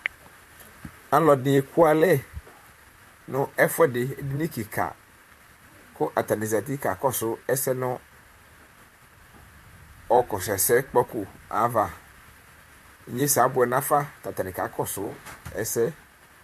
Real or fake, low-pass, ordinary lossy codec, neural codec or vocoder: fake; 14.4 kHz; MP3, 64 kbps; vocoder, 44.1 kHz, 128 mel bands, Pupu-Vocoder